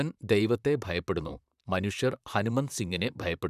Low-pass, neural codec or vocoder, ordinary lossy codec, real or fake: 14.4 kHz; vocoder, 44.1 kHz, 128 mel bands, Pupu-Vocoder; none; fake